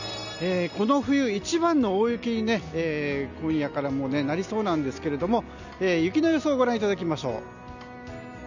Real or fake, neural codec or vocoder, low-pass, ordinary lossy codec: real; none; 7.2 kHz; none